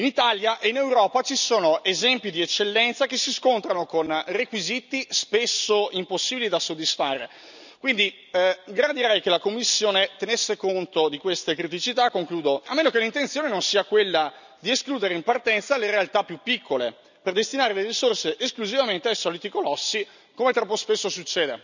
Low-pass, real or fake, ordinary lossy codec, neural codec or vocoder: 7.2 kHz; real; none; none